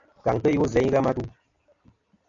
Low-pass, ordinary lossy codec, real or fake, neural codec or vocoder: 7.2 kHz; AAC, 64 kbps; real; none